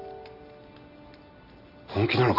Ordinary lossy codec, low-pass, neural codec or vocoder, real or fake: none; 5.4 kHz; none; real